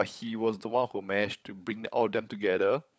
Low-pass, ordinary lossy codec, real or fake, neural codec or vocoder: none; none; fake; codec, 16 kHz, 16 kbps, FunCodec, trained on LibriTTS, 50 frames a second